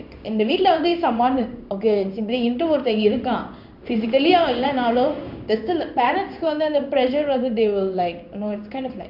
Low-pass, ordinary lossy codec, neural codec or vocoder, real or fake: 5.4 kHz; none; codec, 16 kHz in and 24 kHz out, 1 kbps, XY-Tokenizer; fake